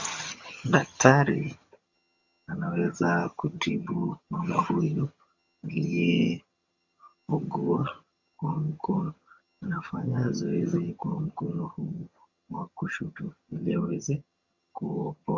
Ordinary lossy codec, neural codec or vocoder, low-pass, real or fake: Opus, 64 kbps; vocoder, 22.05 kHz, 80 mel bands, HiFi-GAN; 7.2 kHz; fake